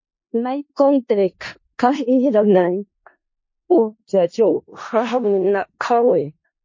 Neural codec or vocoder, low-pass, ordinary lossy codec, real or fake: codec, 16 kHz in and 24 kHz out, 0.4 kbps, LongCat-Audio-Codec, four codebook decoder; 7.2 kHz; MP3, 32 kbps; fake